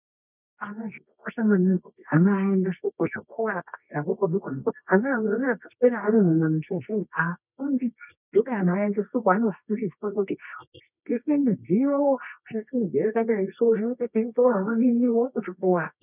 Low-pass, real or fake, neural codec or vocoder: 3.6 kHz; fake; codec, 24 kHz, 0.9 kbps, WavTokenizer, medium music audio release